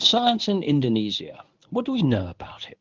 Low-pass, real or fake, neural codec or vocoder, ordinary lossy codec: 7.2 kHz; fake; codec, 24 kHz, 0.9 kbps, WavTokenizer, medium speech release version 1; Opus, 32 kbps